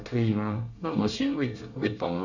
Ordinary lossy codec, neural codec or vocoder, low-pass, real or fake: none; codec, 24 kHz, 1 kbps, SNAC; 7.2 kHz; fake